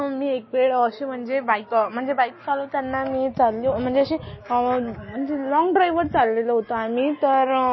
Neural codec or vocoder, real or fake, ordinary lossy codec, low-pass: none; real; MP3, 24 kbps; 7.2 kHz